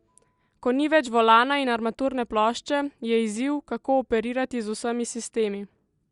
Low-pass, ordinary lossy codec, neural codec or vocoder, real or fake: 10.8 kHz; Opus, 64 kbps; none; real